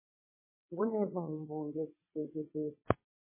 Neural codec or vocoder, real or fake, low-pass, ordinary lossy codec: vocoder, 22.05 kHz, 80 mel bands, WaveNeXt; fake; 3.6 kHz; MP3, 16 kbps